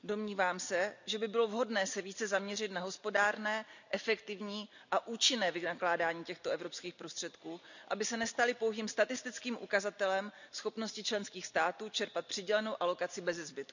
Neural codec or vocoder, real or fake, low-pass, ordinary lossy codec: none; real; 7.2 kHz; none